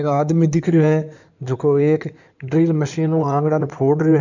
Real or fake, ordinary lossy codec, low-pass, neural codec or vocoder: fake; none; 7.2 kHz; codec, 16 kHz in and 24 kHz out, 2.2 kbps, FireRedTTS-2 codec